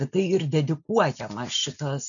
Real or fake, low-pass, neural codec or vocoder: real; 7.2 kHz; none